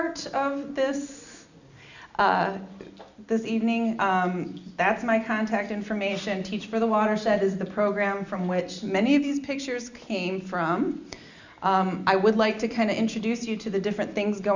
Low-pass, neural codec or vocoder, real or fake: 7.2 kHz; none; real